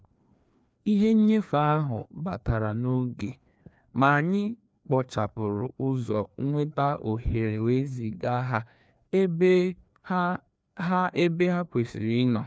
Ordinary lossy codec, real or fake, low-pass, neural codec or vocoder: none; fake; none; codec, 16 kHz, 2 kbps, FreqCodec, larger model